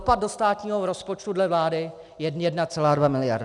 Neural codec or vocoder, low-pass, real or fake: none; 10.8 kHz; real